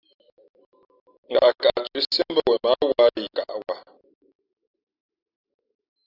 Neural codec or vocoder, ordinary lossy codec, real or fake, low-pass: none; AAC, 48 kbps; real; 5.4 kHz